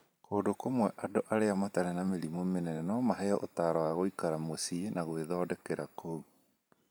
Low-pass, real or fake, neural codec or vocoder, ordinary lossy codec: none; fake; vocoder, 44.1 kHz, 128 mel bands every 512 samples, BigVGAN v2; none